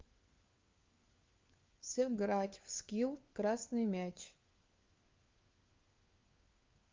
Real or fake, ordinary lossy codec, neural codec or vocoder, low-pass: fake; Opus, 24 kbps; codec, 16 kHz, 4 kbps, FunCodec, trained on LibriTTS, 50 frames a second; 7.2 kHz